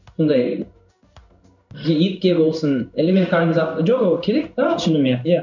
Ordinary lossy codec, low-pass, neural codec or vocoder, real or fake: none; 7.2 kHz; codec, 16 kHz in and 24 kHz out, 1 kbps, XY-Tokenizer; fake